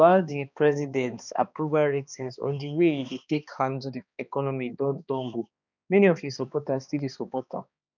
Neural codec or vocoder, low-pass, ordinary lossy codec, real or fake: codec, 16 kHz, 2 kbps, X-Codec, HuBERT features, trained on balanced general audio; 7.2 kHz; none; fake